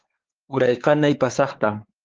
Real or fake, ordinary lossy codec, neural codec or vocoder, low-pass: fake; Opus, 16 kbps; codec, 16 kHz, 4 kbps, X-Codec, WavLM features, trained on Multilingual LibriSpeech; 7.2 kHz